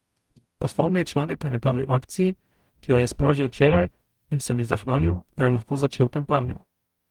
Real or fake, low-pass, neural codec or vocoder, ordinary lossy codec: fake; 19.8 kHz; codec, 44.1 kHz, 0.9 kbps, DAC; Opus, 32 kbps